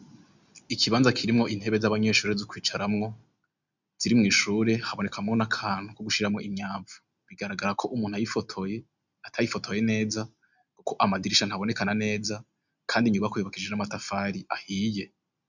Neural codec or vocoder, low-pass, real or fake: none; 7.2 kHz; real